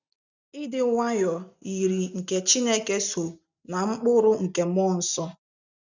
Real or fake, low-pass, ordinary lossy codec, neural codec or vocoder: real; 7.2 kHz; none; none